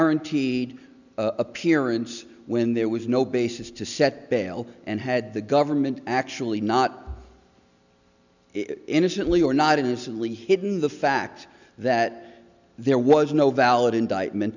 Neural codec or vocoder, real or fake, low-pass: none; real; 7.2 kHz